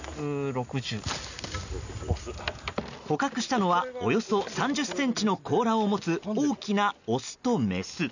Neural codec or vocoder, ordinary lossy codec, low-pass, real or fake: none; none; 7.2 kHz; real